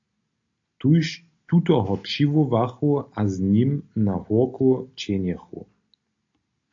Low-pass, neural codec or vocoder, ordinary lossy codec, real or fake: 7.2 kHz; none; AAC, 48 kbps; real